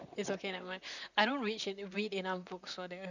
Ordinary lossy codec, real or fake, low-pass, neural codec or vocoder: none; fake; 7.2 kHz; vocoder, 44.1 kHz, 128 mel bands, Pupu-Vocoder